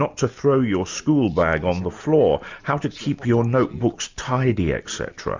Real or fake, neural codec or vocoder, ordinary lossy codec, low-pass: real; none; MP3, 64 kbps; 7.2 kHz